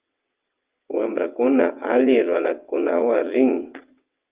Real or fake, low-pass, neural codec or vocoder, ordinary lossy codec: fake; 3.6 kHz; vocoder, 22.05 kHz, 80 mel bands, WaveNeXt; Opus, 32 kbps